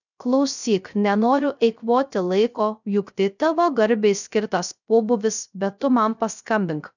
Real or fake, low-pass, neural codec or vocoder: fake; 7.2 kHz; codec, 16 kHz, 0.3 kbps, FocalCodec